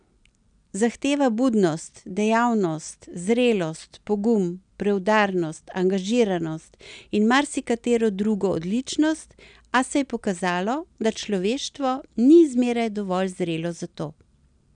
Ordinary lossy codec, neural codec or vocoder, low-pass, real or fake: none; none; 9.9 kHz; real